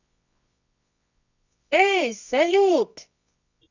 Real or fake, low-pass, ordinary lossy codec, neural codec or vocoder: fake; 7.2 kHz; none; codec, 24 kHz, 0.9 kbps, WavTokenizer, medium music audio release